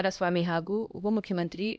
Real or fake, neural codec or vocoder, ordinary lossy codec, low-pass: fake; codec, 16 kHz, 1 kbps, X-Codec, HuBERT features, trained on LibriSpeech; none; none